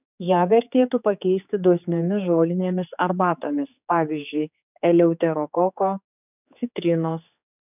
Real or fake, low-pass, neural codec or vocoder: fake; 3.6 kHz; codec, 16 kHz, 4 kbps, X-Codec, HuBERT features, trained on general audio